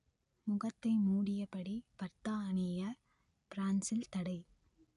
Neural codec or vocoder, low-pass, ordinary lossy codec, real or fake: none; 10.8 kHz; none; real